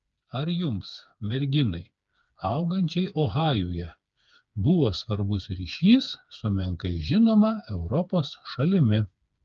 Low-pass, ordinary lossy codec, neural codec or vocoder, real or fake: 7.2 kHz; Opus, 24 kbps; codec, 16 kHz, 4 kbps, FreqCodec, smaller model; fake